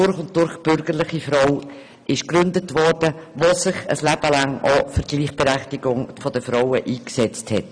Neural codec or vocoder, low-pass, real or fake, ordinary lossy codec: none; 9.9 kHz; real; none